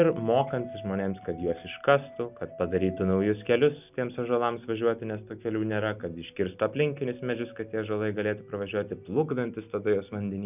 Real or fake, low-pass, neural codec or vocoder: real; 3.6 kHz; none